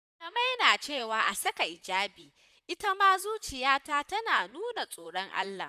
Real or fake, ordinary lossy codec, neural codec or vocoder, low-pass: fake; none; vocoder, 44.1 kHz, 128 mel bands, Pupu-Vocoder; 14.4 kHz